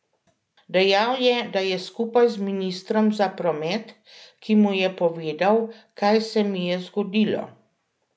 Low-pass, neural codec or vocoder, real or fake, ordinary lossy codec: none; none; real; none